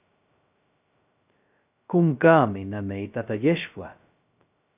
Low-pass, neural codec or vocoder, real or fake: 3.6 kHz; codec, 16 kHz, 0.2 kbps, FocalCodec; fake